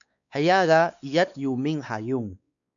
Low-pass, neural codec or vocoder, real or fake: 7.2 kHz; codec, 16 kHz, 4 kbps, X-Codec, WavLM features, trained on Multilingual LibriSpeech; fake